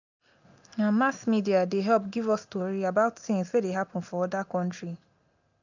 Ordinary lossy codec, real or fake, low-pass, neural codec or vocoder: none; real; 7.2 kHz; none